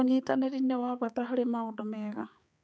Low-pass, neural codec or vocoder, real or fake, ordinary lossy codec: none; codec, 16 kHz, 4 kbps, X-Codec, HuBERT features, trained on balanced general audio; fake; none